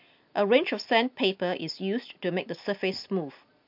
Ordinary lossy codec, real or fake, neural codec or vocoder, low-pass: MP3, 48 kbps; real; none; 5.4 kHz